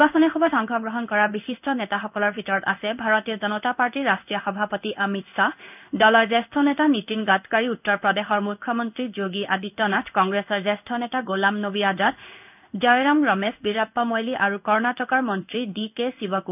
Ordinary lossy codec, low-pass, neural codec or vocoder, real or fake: none; 3.6 kHz; codec, 16 kHz in and 24 kHz out, 1 kbps, XY-Tokenizer; fake